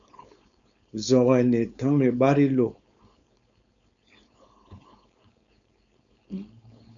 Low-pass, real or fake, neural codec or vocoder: 7.2 kHz; fake; codec, 16 kHz, 4.8 kbps, FACodec